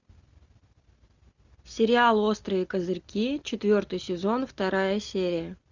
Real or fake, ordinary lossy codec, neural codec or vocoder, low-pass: real; Opus, 64 kbps; none; 7.2 kHz